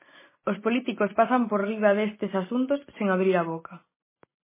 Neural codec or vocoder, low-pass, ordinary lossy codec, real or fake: none; 3.6 kHz; MP3, 16 kbps; real